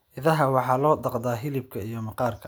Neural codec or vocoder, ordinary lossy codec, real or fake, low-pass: none; none; real; none